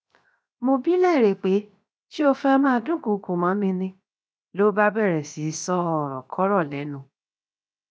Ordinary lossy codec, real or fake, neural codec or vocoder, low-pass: none; fake; codec, 16 kHz, 0.7 kbps, FocalCodec; none